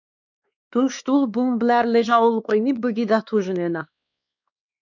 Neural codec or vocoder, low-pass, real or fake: codec, 16 kHz, 2 kbps, X-Codec, WavLM features, trained on Multilingual LibriSpeech; 7.2 kHz; fake